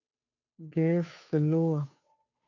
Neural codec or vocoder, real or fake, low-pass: codec, 16 kHz, 2 kbps, FunCodec, trained on Chinese and English, 25 frames a second; fake; 7.2 kHz